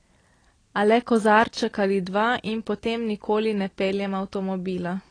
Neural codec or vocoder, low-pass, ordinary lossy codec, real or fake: none; 9.9 kHz; AAC, 32 kbps; real